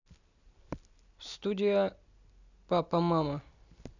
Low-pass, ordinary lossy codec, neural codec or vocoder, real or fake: 7.2 kHz; none; none; real